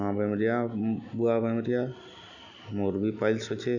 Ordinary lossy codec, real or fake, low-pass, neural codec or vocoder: none; real; 7.2 kHz; none